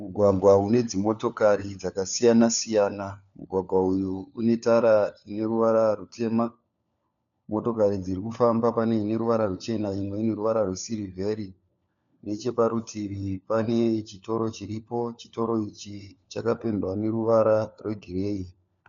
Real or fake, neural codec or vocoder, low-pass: fake; codec, 16 kHz, 4 kbps, FunCodec, trained on LibriTTS, 50 frames a second; 7.2 kHz